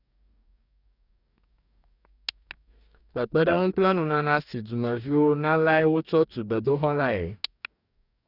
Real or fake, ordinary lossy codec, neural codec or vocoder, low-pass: fake; none; codec, 44.1 kHz, 2.6 kbps, DAC; 5.4 kHz